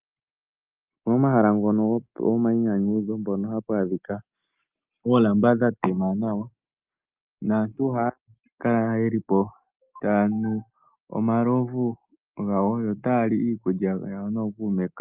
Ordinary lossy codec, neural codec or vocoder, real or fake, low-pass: Opus, 24 kbps; none; real; 3.6 kHz